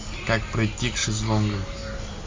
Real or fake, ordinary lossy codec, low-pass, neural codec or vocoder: real; MP3, 48 kbps; 7.2 kHz; none